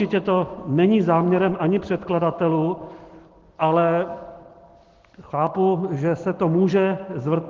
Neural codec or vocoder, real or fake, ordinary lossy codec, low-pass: none; real; Opus, 16 kbps; 7.2 kHz